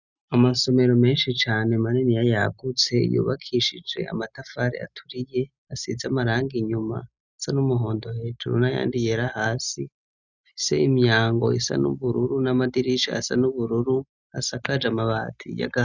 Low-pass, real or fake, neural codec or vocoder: 7.2 kHz; real; none